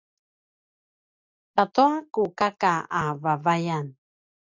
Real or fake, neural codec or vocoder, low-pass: real; none; 7.2 kHz